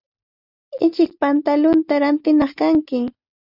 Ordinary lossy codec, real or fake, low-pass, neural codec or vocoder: Opus, 64 kbps; real; 5.4 kHz; none